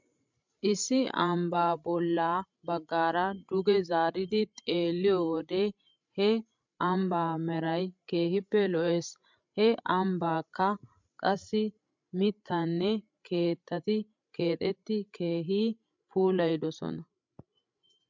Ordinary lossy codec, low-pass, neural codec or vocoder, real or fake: MP3, 64 kbps; 7.2 kHz; codec, 16 kHz, 16 kbps, FreqCodec, larger model; fake